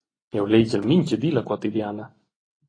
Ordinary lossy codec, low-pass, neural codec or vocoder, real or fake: AAC, 32 kbps; 9.9 kHz; vocoder, 24 kHz, 100 mel bands, Vocos; fake